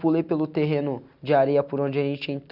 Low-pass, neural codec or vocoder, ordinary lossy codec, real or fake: 5.4 kHz; none; none; real